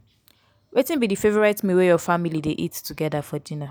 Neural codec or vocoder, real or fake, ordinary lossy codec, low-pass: none; real; none; none